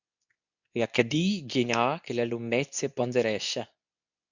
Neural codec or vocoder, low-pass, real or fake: codec, 24 kHz, 0.9 kbps, WavTokenizer, medium speech release version 2; 7.2 kHz; fake